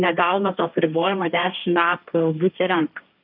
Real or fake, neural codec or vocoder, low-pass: fake; codec, 16 kHz, 1.1 kbps, Voila-Tokenizer; 5.4 kHz